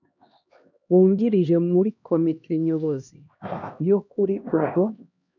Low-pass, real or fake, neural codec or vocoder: 7.2 kHz; fake; codec, 16 kHz, 1 kbps, X-Codec, HuBERT features, trained on LibriSpeech